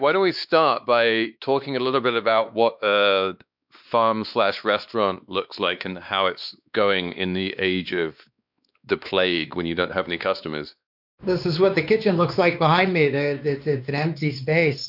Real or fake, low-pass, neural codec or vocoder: fake; 5.4 kHz; codec, 16 kHz, 2 kbps, X-Codec, WavLM features, trained on Multilingual LibriSpeech